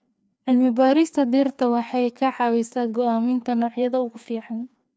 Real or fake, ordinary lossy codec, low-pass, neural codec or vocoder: fake; none; none; codec, 16 kHz, 2 kbps, FreqCodec, larger model